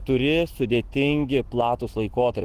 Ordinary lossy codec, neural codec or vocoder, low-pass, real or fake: Opus, 16 kbps; autoencoder, 48 kHz, 128 numbers a frame, DAC-VAE, trained on Japanese speech; 14.4 kHz; fake